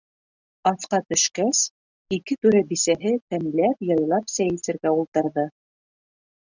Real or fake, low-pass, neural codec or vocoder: real; 7.2 kHz; none